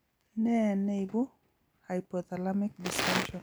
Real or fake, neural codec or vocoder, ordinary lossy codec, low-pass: real; none; none; none